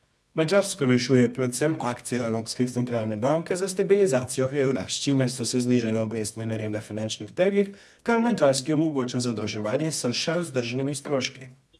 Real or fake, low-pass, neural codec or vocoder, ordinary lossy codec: fake; none; codec, 24 kHz, 0.9 kbps, WavTokenizer, medium music audio release; none